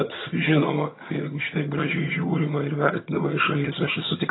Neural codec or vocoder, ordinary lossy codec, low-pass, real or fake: vocoder, 22.05 kHz, 80 mel bands, HiFi-GAN; AAC, 16 kbps; 7.2 kHz; fake